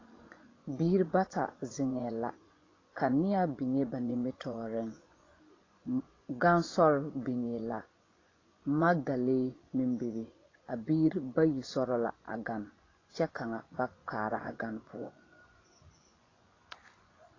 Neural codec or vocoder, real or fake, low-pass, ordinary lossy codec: vocoder, 24 kHz, 100 mel bands, Vocos; fake; 7.2 kHz; AAC, 32 kbps